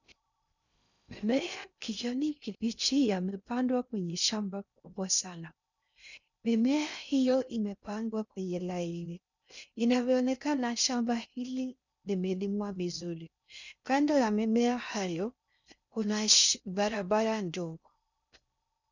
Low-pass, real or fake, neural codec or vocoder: 7.2 kHz; fake; codec, 16 kHz in and 24 kHz out, 0.6 kbps, FocalCodec, streaming, 2048 codes